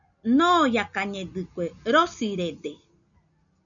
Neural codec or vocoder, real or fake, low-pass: none; real; 7.2 kHz